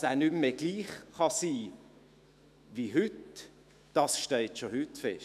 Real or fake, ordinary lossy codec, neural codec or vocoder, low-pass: fake; none; autoencoder, 48 kHz, 128 numbers a frame, DAC-VAE, trained on Japanese speech; 14.4 kHz